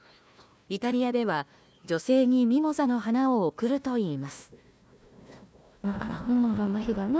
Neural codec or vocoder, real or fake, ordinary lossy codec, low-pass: codec, 16 kHz, 1 kbps, FunCodec, trained on Chinese and English, 50 frames a second; fake; none; none